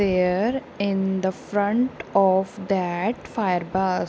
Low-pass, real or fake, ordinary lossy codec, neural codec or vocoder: none; real; none; none